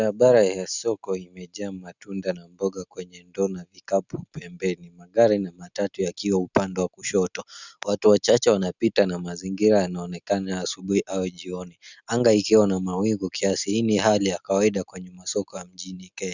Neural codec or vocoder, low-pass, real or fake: none; 7.2 kHz; real